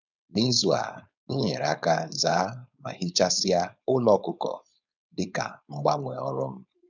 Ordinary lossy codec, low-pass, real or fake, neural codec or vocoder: none; 7.2 kHz; fake; codec, 16 kHz, 4.8 kbps, FACodec